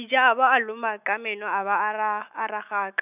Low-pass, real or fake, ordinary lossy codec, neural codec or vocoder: 3.6 kHz; fake; none; autoencoder, 48 kHz, 128 numbers a frame, DAC-VAE, trained on Japanese speech